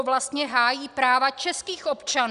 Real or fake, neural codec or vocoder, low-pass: real; none; 10.8 kHz